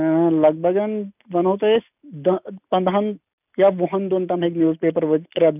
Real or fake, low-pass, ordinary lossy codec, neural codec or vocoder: real; 3.6 kHz; none; none